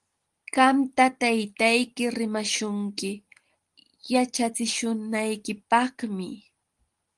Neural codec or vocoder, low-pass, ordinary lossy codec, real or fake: none; 10.8 kHz; Opus, 24 kbps; real